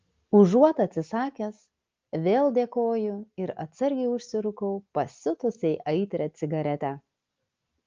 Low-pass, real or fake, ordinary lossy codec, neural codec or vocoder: 7.2 kHz; real; Opus, 24 kbps; none